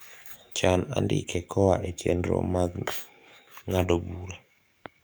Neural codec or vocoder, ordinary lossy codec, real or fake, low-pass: codec, 44.1 kHz, 7.8 kbps, DAC; none; fake; none